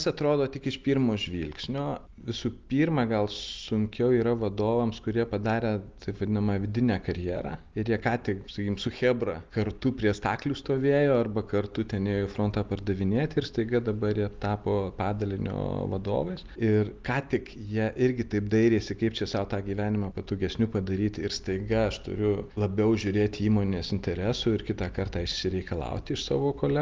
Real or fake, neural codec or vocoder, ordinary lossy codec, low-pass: real; none; Opus, 32 kbps; 7.2 kHz